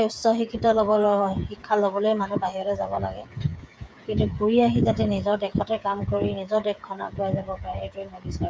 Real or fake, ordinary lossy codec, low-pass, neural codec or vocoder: fake; none; none; codec, 16 kHz, 8 kbps, FreqCodec, smaller model